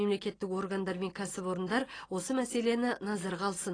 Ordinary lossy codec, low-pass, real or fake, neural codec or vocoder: AAC, 32 kbps; 9.9 kHz; real; none